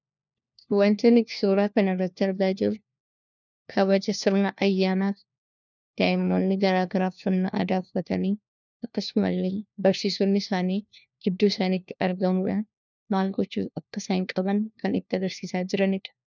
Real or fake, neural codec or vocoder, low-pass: fake; codec, 16 kHz, 1 kbps, FunCodec, trained on LibriTTS, 50 frames a second; 7.2 kHz